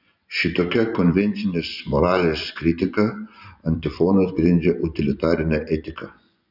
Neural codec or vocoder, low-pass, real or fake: vocoder, 24 kHz, 100 mel bands, Vocos; 5.4 kHz; fake